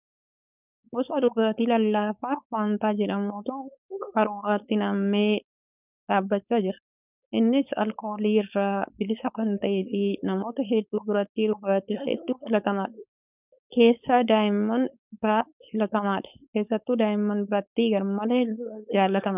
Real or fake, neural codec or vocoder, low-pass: fake; codec, 16 kHz, 4.8 kbps, FACodec; 3.6 kHz